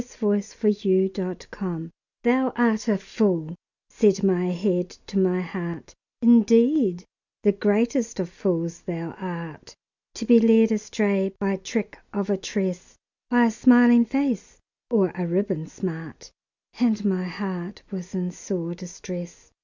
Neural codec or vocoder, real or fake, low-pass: none; real; 7.2 kHz